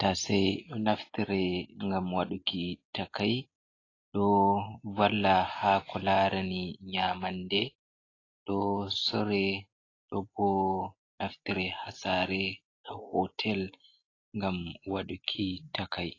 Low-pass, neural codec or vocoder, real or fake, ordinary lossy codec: 7.2 kHz; none; real; AAC, 32 kbps